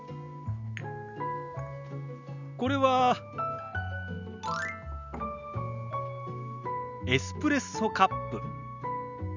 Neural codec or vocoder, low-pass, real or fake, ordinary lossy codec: none; 7.2 kHz; real; none